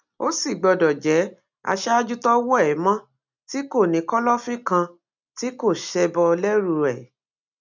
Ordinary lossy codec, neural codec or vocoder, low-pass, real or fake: MP3, 64 kbps; none; 7.2 kHz; real